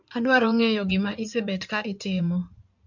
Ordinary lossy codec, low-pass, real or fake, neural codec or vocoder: MP3, 64 kbps; 7.2 kHz; fake; codec, 16 kHz in and 24 kHz out, 2.2 kbps, FireRedTTS-2 codec